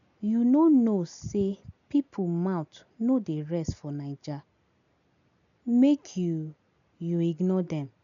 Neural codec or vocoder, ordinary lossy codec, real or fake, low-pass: none; none; real; 7.2 kHz